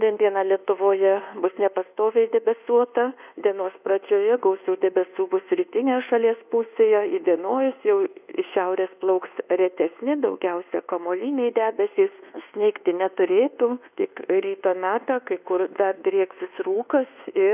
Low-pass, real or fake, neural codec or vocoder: 3.6 kHz; fake; codec, 24 kHz, 1.2 kbps, DualCodec